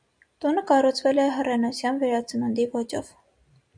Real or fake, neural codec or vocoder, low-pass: real; none; 9.9 kHz